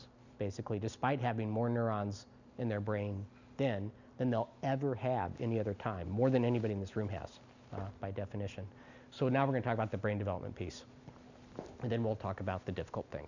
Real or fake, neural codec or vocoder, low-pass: real; none; 7.2 kHz